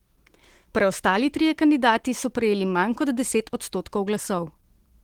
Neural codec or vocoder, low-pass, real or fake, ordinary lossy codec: autoencoder, 48 kHz, 128 numbers a frame, DAC-VAE, trained on Japanese speech; 19.8 kHz; fake; Opus, 16 kbps